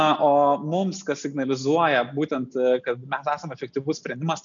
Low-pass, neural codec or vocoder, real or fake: 7.2 kHz; none; real